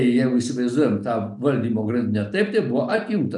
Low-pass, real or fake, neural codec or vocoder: 10.8 kHz; real; none